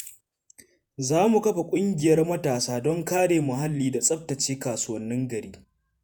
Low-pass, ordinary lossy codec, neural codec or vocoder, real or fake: none; none; vocoder, 48 kHz, 128 mel bands, Vocos; fake